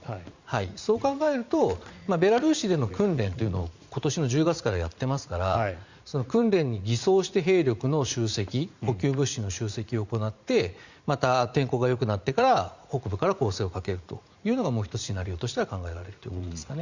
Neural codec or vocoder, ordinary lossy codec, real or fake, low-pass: vocoder, 44.1 kHz, 80 mel bands, Vocos; Opus, 64 kbps; fake; 7.2 kHz